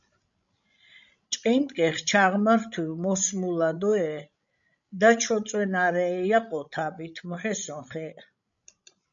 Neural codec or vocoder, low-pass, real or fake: codec, 16 kHz, 16 kbps, FreqCodec, larger model; 7.2 kHz; fake